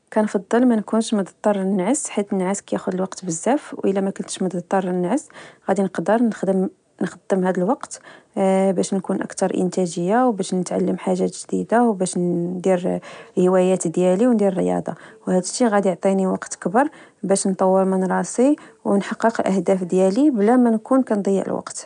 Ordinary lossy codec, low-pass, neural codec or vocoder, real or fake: none; 9.9 kHz; none; real